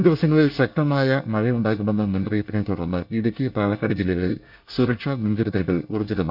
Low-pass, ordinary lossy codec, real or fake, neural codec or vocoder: 5.4 kHz; none; fake; codec, 24 kHz, 1 kbps, SNAC